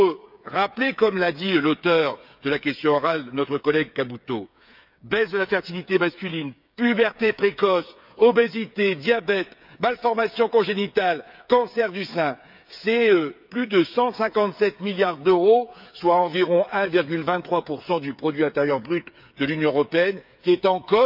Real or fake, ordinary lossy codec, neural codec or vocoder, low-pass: fake; none; codec, 16 kHz, 8 kbps, FreqCodec, smaller model; 5.4 kHz